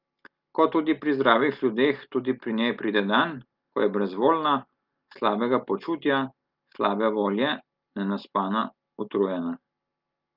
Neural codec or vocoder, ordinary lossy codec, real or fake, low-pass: none; Opus, 32 kbps; real; 5.4 kHz